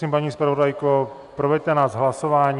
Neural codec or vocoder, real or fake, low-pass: none; real; 10.8 kHz